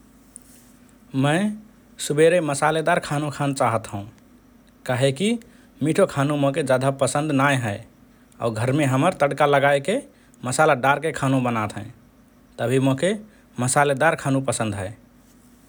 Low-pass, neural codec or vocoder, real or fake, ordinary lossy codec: none; none; real; none